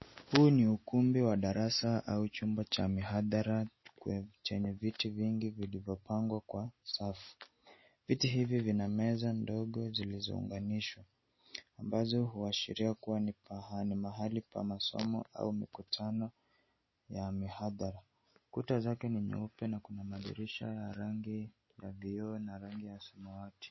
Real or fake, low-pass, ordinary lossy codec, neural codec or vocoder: real; 7.2 kHz; MP3, 24 kbps; none